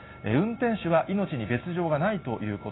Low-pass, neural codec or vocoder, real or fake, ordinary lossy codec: 7.2 kHz; none; real; AAC, 16 kbps